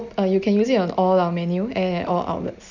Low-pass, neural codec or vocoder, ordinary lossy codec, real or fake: 7.2 kHz; none; none; real